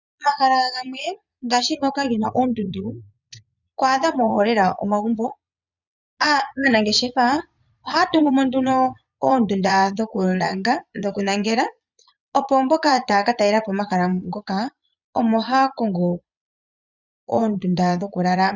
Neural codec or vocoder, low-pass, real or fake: vocoder, 22.05 kHz, 80 mel bands, Vocos; 7.2 kHz; fake